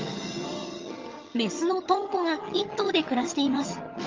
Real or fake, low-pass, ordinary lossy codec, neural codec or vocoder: fake; 7.2 kHz; Opus, 32 kbps; vocoder, 22.05 kHz, 80 mel bands, HiFi-GAN